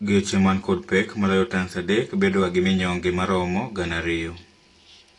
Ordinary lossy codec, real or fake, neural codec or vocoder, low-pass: AAC, 32 kbps; real; none; 10.8 kHz